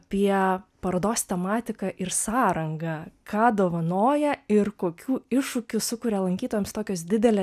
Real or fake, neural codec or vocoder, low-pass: real; none; 14.4 kHz